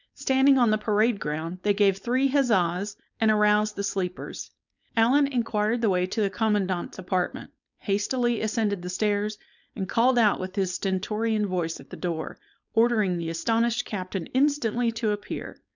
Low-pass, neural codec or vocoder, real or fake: 7.2 kHz; codec, 16 kHz, 4.8 kbps, FACodec; fake